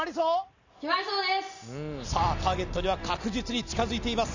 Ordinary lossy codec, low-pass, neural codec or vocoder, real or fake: none; 7.2 kHz; none; real